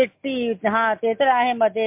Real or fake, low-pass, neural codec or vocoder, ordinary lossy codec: real; 3.6 kHz; none; MP3, 32 kbps